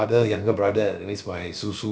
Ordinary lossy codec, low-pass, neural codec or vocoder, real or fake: none; none; codec, 16 kHz, about 1 kbps, DyCAST, with the encoder's durations; fake